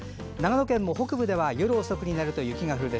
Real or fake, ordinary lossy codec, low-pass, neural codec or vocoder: real; none; none; none